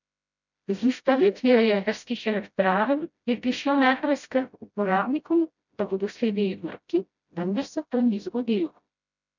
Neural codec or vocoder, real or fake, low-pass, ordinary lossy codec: codec, 16 kHz, 0.5 kbps, FreqCodec, smaller model; fake; 7.2 kHz; none